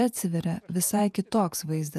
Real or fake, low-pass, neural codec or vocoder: fake; 14.4 kHz; vocoder, 48 kHz, 128 mel bands, Vocos